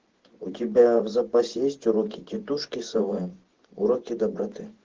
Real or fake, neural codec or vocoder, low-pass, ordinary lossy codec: fake; vocoder, 24 kHz, 100 mel bands, Vocos; 7.2 kHz; Opus, 16 kbps